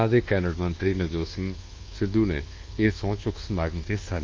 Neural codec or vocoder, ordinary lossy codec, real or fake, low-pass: codec, 24 kHz, 1.2 kbps, DualCodec; Opus, 32 kbps; fake; 7.2 kHz